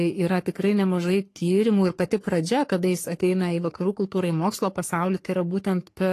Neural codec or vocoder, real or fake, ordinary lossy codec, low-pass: codec, 44.1 kHz, 3.4 kbps, Pupu-Codec; fake; AAC, 48 kbps; 14.4 kHz